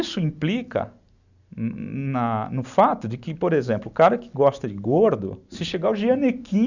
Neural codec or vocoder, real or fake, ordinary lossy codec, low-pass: none; real; none; 7.2 kHz